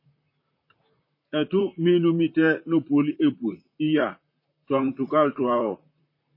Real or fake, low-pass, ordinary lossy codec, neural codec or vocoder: fake; 5.4 kHz; MP3, 24 kbps; vocoder, 44.1 kHz, 128 mel bands, Pupu-Vocoder